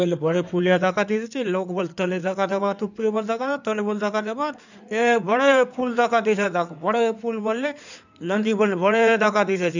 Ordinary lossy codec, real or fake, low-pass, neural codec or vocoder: none; fake; 7.2 kHz; codec, 16 kHz in and 24 kHz out, 2.2 kbps, FireRedTTS-2 codec